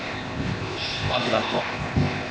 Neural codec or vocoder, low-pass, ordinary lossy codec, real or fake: codec, 16 kHz, 0.8 kbps, ZipCodec; none; none; fake